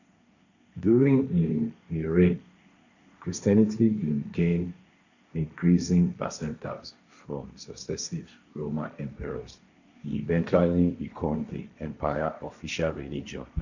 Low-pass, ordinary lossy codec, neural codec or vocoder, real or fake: 7.2 kHz; none; codec, 16 kHz, 1.1 kbps, Voila-Tokenizer; fake